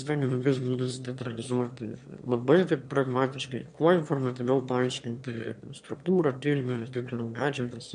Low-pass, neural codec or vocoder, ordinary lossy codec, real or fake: 9.9 kHz; autoencoder, 22.05 kHz, a latent of 192 numbers a frame, VITS, trained on one speaker; MP3, 64 kbps; fake